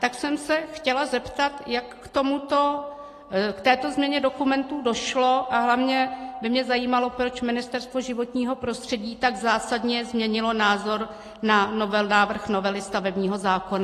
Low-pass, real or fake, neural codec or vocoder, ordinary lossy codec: 14.4 kHz; real; none; AAC, 48 kbps